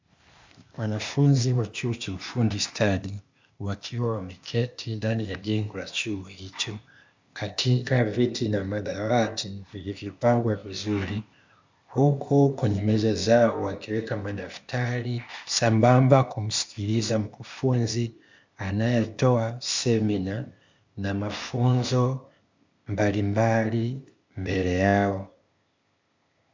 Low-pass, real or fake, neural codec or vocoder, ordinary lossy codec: 7.2 kHz; fake; codec, 16 kHz, 0.8 kbps, ZipCodec; MP3, 64 kbps